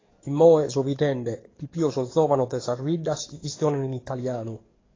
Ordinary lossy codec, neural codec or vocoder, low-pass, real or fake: AAC, 32 kbps; codec, 44.1 kHz, 7.8 kbps, DAC; 7.2 kHz; fake